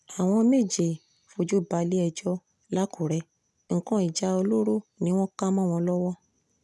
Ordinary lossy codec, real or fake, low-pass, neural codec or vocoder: none; real; none; none